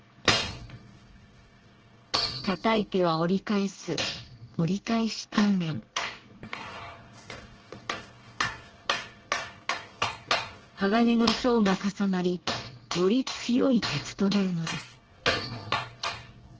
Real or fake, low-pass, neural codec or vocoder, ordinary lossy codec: fake; 7.2 kHz; codec, 24 kHz, 1 kbps, SNAC; Opus, 16 kbps